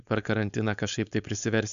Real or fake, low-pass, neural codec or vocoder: fake; 7.2 kHz; codec, 16 kHz, 4.8 kbps, FACodec